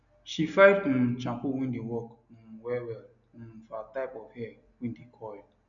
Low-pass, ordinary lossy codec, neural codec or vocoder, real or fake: 7.2 kHz; none; none; real